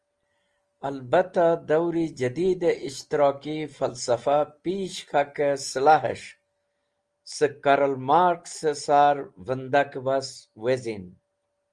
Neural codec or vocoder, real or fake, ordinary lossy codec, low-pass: none; real; Opus, 24 kbps; 9.9 kHz